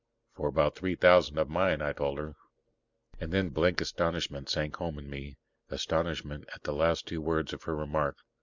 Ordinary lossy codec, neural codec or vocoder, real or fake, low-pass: Opus, 64 kbps; none; real; 7.2 kHz